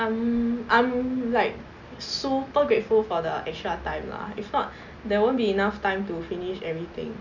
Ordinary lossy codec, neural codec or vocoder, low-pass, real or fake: none; none; 7.2 kHz; real